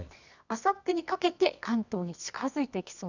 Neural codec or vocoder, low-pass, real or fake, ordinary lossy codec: codec, 24 kHz, 0.9 kbps, WavTokenizer, medium music audio release; 7.2 kHz; fake; none